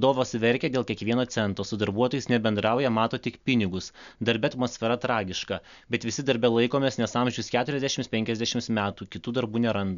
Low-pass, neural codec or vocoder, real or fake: 7.2 kHz; none; real